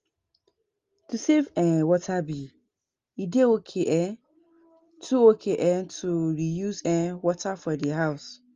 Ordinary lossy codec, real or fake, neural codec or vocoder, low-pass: Opus, 32 kbps; real; none; 7.2 kHz